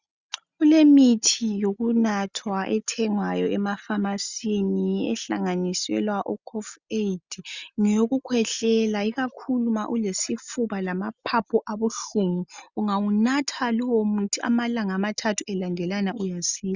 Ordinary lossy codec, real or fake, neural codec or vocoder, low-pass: Opus, 64 kbps; real; none; 7.2 kHz